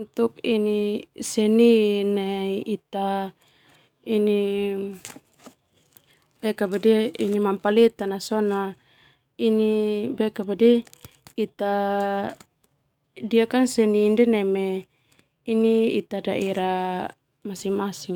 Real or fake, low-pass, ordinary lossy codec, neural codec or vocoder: fake; 19.8 kHz; none; codec, 44.1 kHz, 7.8 kbps, DAC